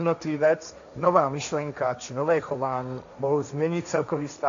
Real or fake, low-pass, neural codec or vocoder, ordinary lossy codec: fake; 7.2 kHz; codec, 16 kHz, 1.1 kbps, Voila-Tokenizer; AAC, 64 kbps